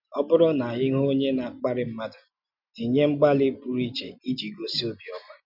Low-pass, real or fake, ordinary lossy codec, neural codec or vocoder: 5.4 kHz; real; none; none